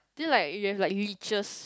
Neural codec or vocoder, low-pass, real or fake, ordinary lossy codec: none; none; real; none